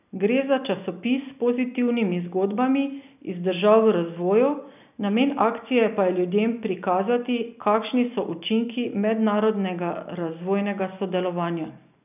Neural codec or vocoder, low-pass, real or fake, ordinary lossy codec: none; 3.6 kHz; real; none